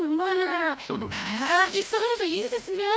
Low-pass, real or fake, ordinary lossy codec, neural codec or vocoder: none; fake; none; codec, 16 kHz, 0.5 kbps, FreqCodec, larger model